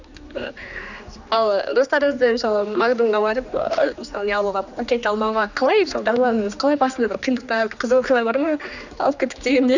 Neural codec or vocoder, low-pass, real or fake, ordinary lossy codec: codec, 16 kHz, 2 kbps, X-Codec, HuBERT features, trained on general audio; 7.2 kHz; fake; none